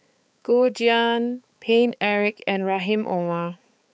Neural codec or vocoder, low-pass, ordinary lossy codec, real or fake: codec, 16 kHz, 4 kbps, X-Codec, HuBERT features, trained on balanced general audio; none; none; fake